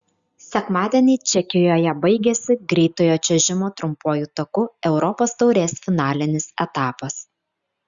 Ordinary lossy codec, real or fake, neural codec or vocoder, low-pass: Opus, 64 kbps; real; none; 7.2 kHz